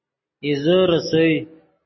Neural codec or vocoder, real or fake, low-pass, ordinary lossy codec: none; real; 7.2 kHz; MP3, 24 kbps